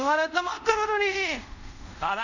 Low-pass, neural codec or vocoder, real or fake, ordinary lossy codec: 7.2 kHz; codec, 24 kHz, 0.5 kbps, DualCodec; fake; none